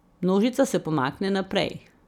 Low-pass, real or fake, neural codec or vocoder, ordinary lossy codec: 19.8 kHz; real; none; none